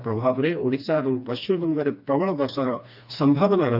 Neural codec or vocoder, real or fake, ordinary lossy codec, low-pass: codec, 16 kHz, 2 kbps, FreqCodec, smaller model; fake; none; 5.4 kHz